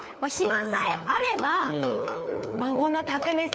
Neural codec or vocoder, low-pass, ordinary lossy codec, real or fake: codec, 16 kHz, 4 kbps, FunCodec, trained on LibriTTS, 50 frames a second; none; none; fake